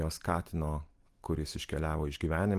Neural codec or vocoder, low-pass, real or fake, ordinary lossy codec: none; 14.4 kHz; real; Opus, 24 kbps